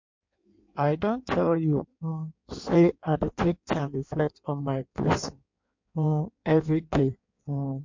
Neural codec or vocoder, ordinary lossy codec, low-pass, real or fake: codec, 16 kHz in and 24 kHz out, 1.1 kbps, FireRedTTS-2 codec; MP3, 48 kbps; 7.2 kHz; fake